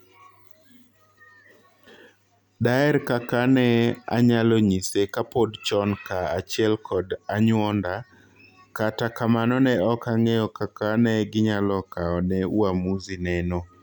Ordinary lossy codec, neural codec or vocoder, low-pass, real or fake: none; none; 19.8 kHz; real